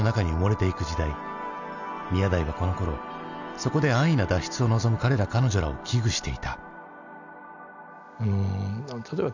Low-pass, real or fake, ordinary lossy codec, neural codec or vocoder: 7.2 kHz; real; none; none